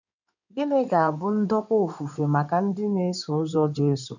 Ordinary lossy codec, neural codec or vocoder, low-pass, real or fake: none; codec, 16 kHz in and 24 kHz out, 2.2 kbps, FireRedTTS-2 codec; 7.2 kHz; fake